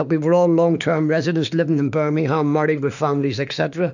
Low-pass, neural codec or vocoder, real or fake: 7.2 kHz; autoencoder, 48 kHz, 32 numbers a frame, DAC-VAE, trained on Japanese speech; fake